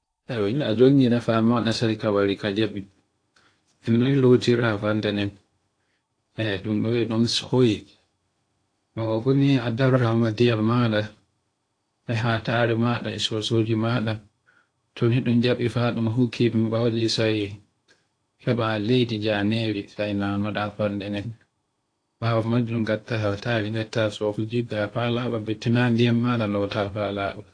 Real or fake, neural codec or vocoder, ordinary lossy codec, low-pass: fake; codec, 16 kHz in and 24 kHz out, 0.8 kbps, FocalCodec, streaming, 65536 codes; AAC, 48 kbps; 9.9 kHz